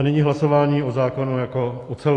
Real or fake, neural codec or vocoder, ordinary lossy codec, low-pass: real; none; AAC, 48 kbps; 10.8 kHz